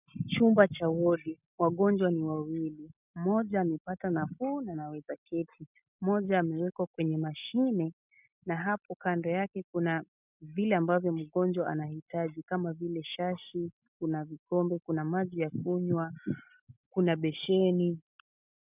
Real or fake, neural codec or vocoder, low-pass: real; none; 3.6 kHz